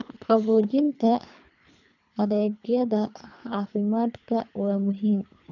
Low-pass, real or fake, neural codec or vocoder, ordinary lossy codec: 7.2 kHz; fake; codec, 24 kHz, 3 kbps, HILCodec; none